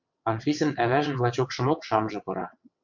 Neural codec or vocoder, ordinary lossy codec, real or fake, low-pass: vocoder, 22.05 kHz, 80 mel bands, WaveNeXt; MP3, 64 kbps; fake; 7.2 kHz